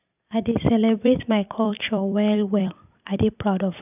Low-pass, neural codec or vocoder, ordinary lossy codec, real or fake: 3.6 kHz; vocoder, 44.1 kHz, 128 mel bands every 256 samples, BigVGAN v2; none; fake